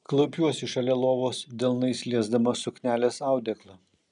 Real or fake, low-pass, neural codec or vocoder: real; 9.9 kHz; none